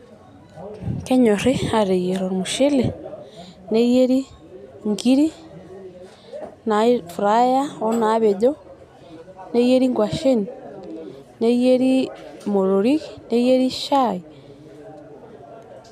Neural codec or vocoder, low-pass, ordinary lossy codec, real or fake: none; 14.4 kHz; none; real